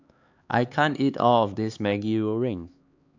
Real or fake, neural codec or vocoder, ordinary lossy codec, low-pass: fake; codec, 16 kHz, 4 kbps, X-Codec, HuBERT features, trained on LibriSpeech; AAC, 48 kbps; 7.2 kHz